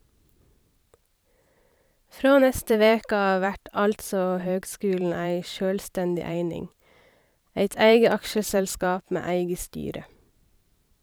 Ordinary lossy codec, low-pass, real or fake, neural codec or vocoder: none; none; fake; vocoder, 44.1 kHz, 128 mel bands every 512 samples, BigVGAN v2